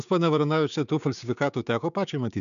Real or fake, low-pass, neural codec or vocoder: fake; 7.2 kHz; codec, 16 kHz, 6 kbps, DAC